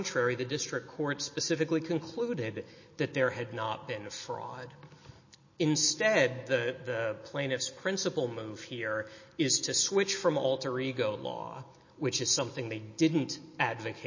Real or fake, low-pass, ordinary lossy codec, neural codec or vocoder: real; 7.2 kHz; MP3, 32 kbps; none